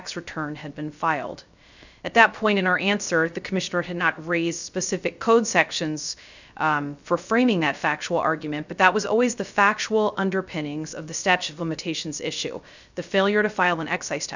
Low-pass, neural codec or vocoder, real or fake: 7.2 kHz; codec, 16 kHz, 0.3 kbps, FocalCodec; fake